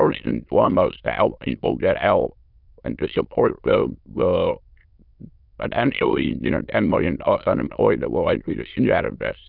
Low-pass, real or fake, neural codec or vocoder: 5.4 kHz; fake; autoencoder, 22.05 kHz, a latent of 192 numbers a frame, VITS, trained on many speakers